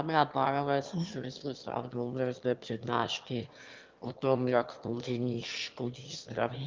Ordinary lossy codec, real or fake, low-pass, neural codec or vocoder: Opus, 32 kbps; fake; 7.2 kHz; autoencoder, 22.05 kHz, a latent of 192 numbers a frame, VITS, trained on one speaker